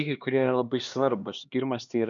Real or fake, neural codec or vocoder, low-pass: fake; codec, 16 kHz, 2 kbps, X-Codec, HuBERT features, trained on LibriSpeech; 7.2 kHz